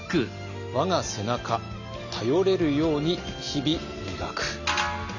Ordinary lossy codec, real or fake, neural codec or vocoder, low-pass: none; real; none; 7.2 kHz